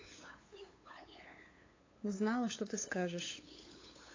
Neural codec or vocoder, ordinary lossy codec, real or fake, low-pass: codec, 16 kHz, 8 kbps, FunCodec, trained on LibriTTS, 25 frames a second; AAC, 32 kbps; fake; 7.2 kHz